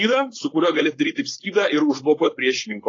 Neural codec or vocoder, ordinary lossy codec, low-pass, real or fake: codec, 16 kHz, 4.8 kbps, FACodec; AAC, 32 kbps; 7.2 kHz; fake